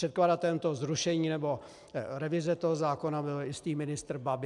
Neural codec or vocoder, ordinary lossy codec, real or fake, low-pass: none; MP3, 96 kbps; real; 10.8 kHz